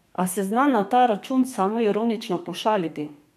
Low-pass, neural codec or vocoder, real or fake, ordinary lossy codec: 14.4 kHz; codec, 32 kHz, 1.9 kbps, SNAC; fake; none